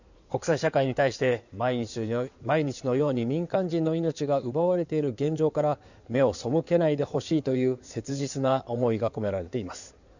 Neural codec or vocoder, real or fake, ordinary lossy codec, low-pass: codec, 16 kHz in and 24 kHz out, 2.2 kbps, FireRedTTS-2 codec; fake; none; 7.2 kHz